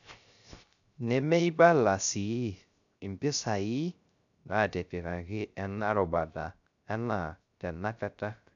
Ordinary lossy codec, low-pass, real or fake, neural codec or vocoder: none; 7.2 kHz; fake; codec, 16 kHz, 0.3 kbps, FocalCodec